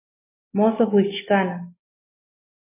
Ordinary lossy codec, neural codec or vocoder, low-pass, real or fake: MP3, 16 kbps; none; 3.6 kHz; real